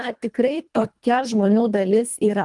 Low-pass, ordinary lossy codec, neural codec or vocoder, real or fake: 10.8 kHz; Opus, 32 kbps; codec, 24 kHz, 1.5 kbps, HILCodec; fake